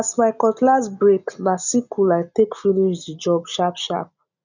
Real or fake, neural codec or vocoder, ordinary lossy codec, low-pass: real; none; none; 7.2 kHz